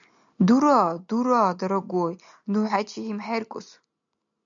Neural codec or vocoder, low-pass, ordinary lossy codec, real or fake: none; 7.2 kHz; MP3, 96 kbps; real